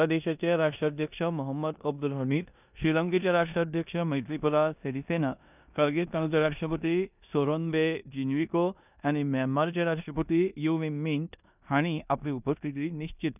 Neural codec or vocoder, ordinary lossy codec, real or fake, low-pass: codec, 16 kHz in and 24 kHz out, 0.9 kbps, LongCat-Audio-Codec, four codebook decoder; none; fake; 3.6 kHz